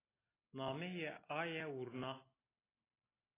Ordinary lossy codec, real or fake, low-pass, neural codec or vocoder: AAC, 16 kbps; real; 3.6 kHz; none